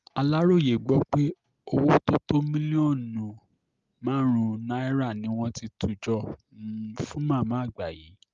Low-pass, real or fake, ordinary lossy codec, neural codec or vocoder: 7.2 kHz; real; Opus, 32 kbps; none